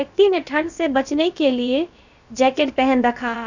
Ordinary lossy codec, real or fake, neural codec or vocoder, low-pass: none; fake; codec, 16 kHz, about 1 kbps, DyCAST, with the encoder's durations; 7.2 kHz